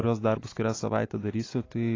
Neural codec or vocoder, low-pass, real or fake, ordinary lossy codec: none; 7.2 kHz; real; AAC, 32 kbps